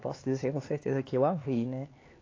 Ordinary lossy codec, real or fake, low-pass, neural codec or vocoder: AAC, 32 kbps; fake; 7.2 kHz; codec, 16 kHz, 4 kbps, X-Codec, HuBERT features, trained on LibriSpeech